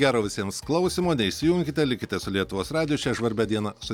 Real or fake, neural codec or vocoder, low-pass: real; none; 19.8 kHz